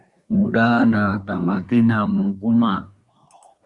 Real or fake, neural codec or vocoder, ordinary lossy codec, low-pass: fake; codec, 24 kHz, 1 kbps, SNAC; Opus, 64 kbps; 10.8 kHz